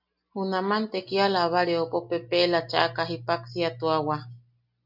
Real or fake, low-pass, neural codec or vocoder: real; 5.4 kHz; none